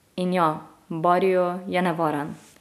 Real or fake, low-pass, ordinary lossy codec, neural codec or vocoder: real; 14.4 kHz; none; none